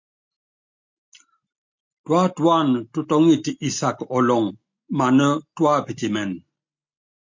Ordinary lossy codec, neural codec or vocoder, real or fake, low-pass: MP3, 48 kbps; none; real; 7.2 kHz